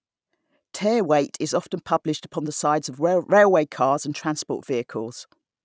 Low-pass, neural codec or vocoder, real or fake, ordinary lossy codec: none; none; real; none